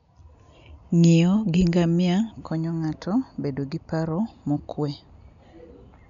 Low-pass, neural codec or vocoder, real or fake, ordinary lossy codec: 7.2 kHz; none; real; none